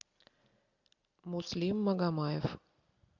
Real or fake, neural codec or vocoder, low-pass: real; none; 7.2 kHz